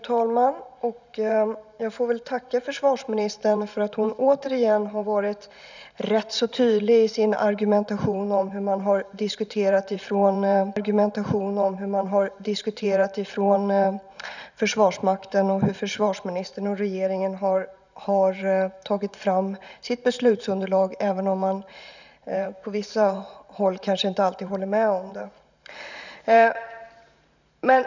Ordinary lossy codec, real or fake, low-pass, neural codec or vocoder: none; fake; 7.2 kHz; vocoder, 44.1 kHz, 128 mel bands every 512 samples, BigVGAN v2